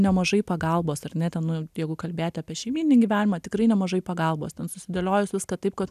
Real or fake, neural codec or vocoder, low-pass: real; none; 14.4 kHz